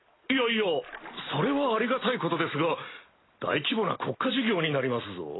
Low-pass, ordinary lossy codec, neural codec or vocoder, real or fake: 7.2 kHz; AAC, 16 kbps; none; real